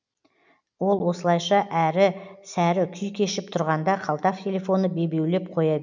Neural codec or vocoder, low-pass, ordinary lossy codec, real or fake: none; 7.2 kHz; none; real